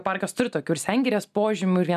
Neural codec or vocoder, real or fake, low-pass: none; real; 14.4 kHz